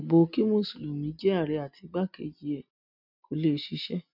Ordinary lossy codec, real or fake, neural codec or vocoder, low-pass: none; real; none; 5.4 kHz